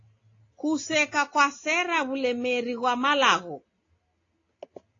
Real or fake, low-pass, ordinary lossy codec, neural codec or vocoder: real; 7.2 kHz; AAC, 32 kbps; none